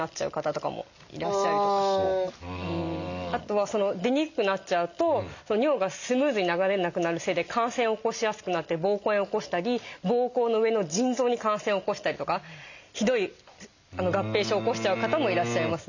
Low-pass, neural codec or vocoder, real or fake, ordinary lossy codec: 7.2 kHz; none; real; none